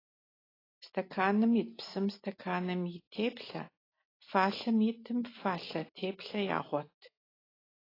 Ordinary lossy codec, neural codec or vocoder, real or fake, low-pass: AAC, 24 kbps; none; real; 5.4 kHz